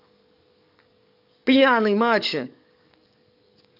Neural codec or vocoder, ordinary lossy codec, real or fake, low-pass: codec, 44.1 kHz, 7.8 kbps, DAC; none; fake; 5.4 kHz